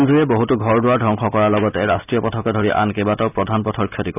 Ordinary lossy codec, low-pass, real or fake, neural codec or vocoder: none; 3.6 kHz; real; none